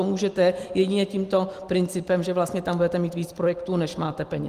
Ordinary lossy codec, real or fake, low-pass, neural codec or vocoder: Opus, 24 kbps; real; 14.4 kHz; none